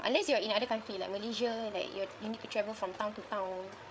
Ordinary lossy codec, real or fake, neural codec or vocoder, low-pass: none; fake; codec, 16 kHz, 8 kbps, FreqCodec, larger model; none